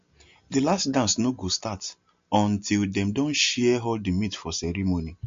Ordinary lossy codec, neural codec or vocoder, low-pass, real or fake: MP3, 48 kbps; none; 7.2 kHz; real